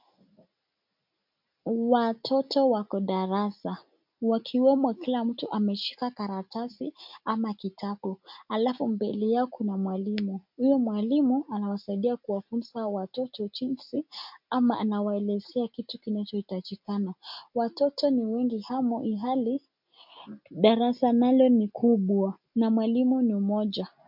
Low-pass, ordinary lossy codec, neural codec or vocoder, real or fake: 5.4 kHz; MP3, 48 kbps; none; real